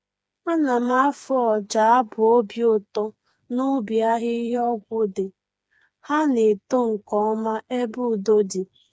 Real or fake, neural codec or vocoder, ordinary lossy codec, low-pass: fake; codec, 16 kHz, 4 kbps, FreqCodec, smaller model; none; none